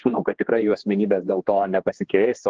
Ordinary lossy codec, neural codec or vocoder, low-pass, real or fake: Opus, 16 kbps; codec, 16 kHz, 2 kbps, X-Codec, HuBERT features, trained on general audio; 7.2 kHz; fake